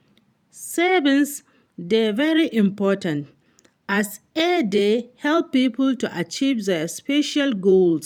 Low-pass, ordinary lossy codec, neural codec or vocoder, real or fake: 19.8 kHz; none; vocoder, 44.1 kHz, 128 mel bands every 512 samples, BigVGAN v2; fake